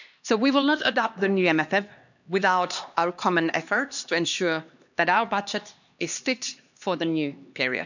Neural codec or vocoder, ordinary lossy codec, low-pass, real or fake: codec, 16 kHz, 2 kbps, X-Codec, HuBERT features, trained on LibriSpeech; none; 7.2 kHz; fake